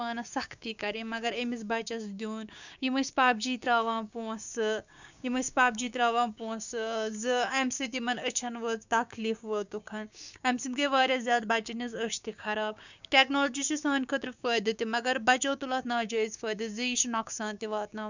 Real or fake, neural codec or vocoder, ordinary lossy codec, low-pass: fake; codec, 16 kHz, 6 kbps, DAC; none; 7.2 kHz